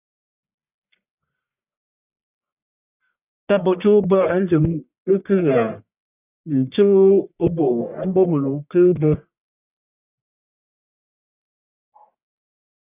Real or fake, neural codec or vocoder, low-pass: fake; codec, 44.1 kHz, 1.7 kbps, Pupu-Codec; 3.6 kHz